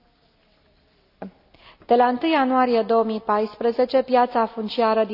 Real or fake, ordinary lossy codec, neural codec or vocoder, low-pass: real; none; none; 5.4 kHz